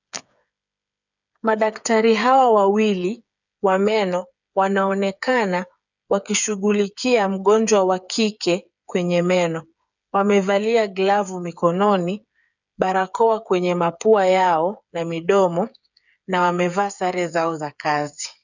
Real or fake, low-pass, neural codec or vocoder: fake; 7.2 kHz; codec, 16 kHz, 8 kbps, FreqCodec, smaller model